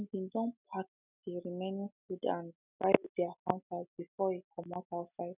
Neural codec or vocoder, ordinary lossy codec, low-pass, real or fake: none; none; 3.6 kHz; real